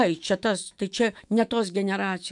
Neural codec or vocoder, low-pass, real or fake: autoencoder, 48 kHz, 128 numbers a frame, DAC-VAE, trained on Japanese speech; 10.8 kHz; fake